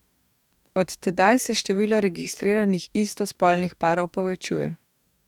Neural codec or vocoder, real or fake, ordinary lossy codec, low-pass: codec, 44.1 kHz, 2.6 kbps, DAC; fake; none; 19.8 kHz